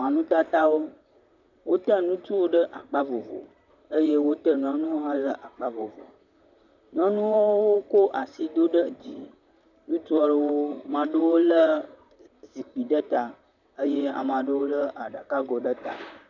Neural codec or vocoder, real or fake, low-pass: vocoder, 44.1 kHz, 128 mel bands, Pupu-Vocoder; fake; 7.2 kHz